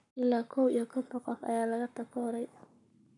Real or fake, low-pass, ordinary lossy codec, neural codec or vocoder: fake; 10.8 kHz; none; codec, 44.1 kHz, 7.8 kbps, Pupu-Codec